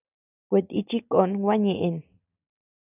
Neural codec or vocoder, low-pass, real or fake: none; 3.6 kHz; real